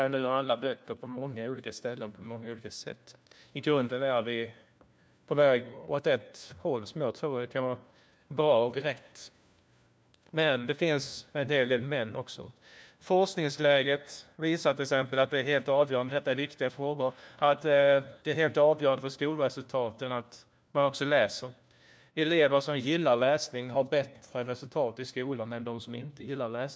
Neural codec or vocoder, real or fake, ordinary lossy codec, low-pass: codec, 16 kHz, 1 kbps, FunCodec, trained on LibriTTS, 50 frames a second; fake; none; none